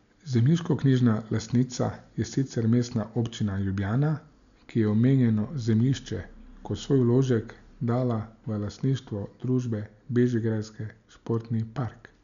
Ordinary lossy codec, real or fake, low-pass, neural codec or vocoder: none; real; 7.2 kHz; none